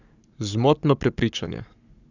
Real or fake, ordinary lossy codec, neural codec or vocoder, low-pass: fake; none; codec, 44.1 kHz, 7.8 kbps, Pupu-Codec; 7.2 kHz